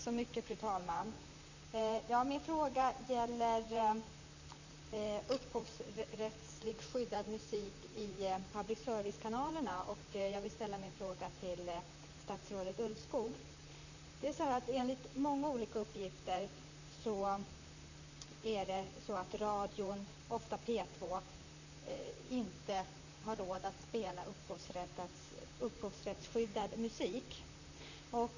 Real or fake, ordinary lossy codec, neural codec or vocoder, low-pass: fake; none; vocoder, 44.1 kHz, 128 mel bands, Pupu-Vocoder; 7.2 kHz